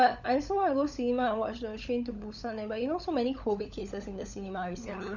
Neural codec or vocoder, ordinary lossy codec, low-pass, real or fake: codec, 16 kHz, 16 kbps, FunCodec, trained on LibriTTS, 50 frames a second; none; 7.2 kHz; fake